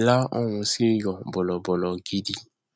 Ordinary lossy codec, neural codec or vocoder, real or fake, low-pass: none; none; real; none